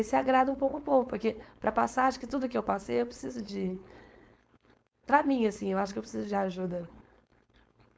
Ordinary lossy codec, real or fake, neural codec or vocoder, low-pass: none; fake; codec, 16 kHz, 4.8 kbps, FACodec; none